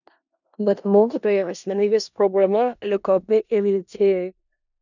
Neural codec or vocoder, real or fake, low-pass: codec, 16 kHz in and 24 kHz out, 0.4 kbps, LongCat-Audio-Codec, four codebook decoder; fake; 7.2 kHz